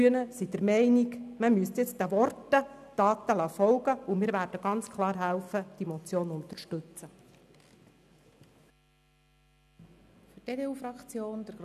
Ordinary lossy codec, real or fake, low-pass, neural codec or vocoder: none; real; 14.4 kHz; none